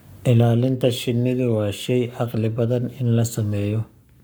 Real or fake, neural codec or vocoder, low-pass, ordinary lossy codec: fake; codec, 44.1 kHz, 7.8 kbps, Pupu-Codec; none; none